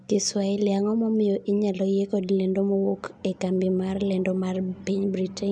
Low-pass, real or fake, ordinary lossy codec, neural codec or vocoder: 9.9 kHz; real; none; none